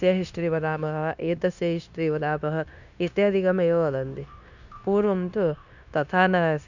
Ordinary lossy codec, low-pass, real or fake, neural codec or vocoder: none; 7.2 kHz; fake; codec, 16 kHz, 0.9 kbps, LongCat-Audio-Codec